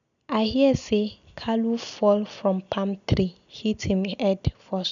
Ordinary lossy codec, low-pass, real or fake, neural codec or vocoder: none; 7.2 kHz; real; none